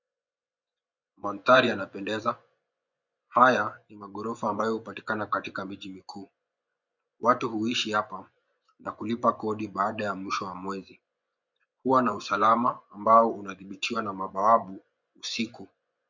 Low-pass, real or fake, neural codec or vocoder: 7.2 kHz; fake; vocoder, 24 kHz, 100 mel bands, Vocos